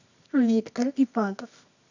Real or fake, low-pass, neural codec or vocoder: fake; 7.2 kHz; codec, 24 kHz, 0.9 kbps, WavTokenizer, medium music audio release